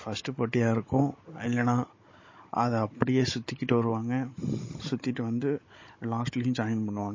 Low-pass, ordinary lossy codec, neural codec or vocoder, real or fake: 7.2 kHz; MP3, 32 kbps; codec, 16 kHz, 16 kbps, FunCodec, trained on Chinese and English, 50 frames a second; fake